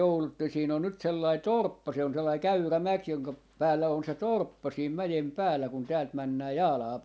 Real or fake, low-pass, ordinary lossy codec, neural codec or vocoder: real; none; none; none